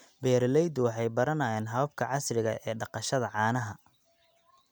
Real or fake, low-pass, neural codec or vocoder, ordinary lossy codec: real; none; none; none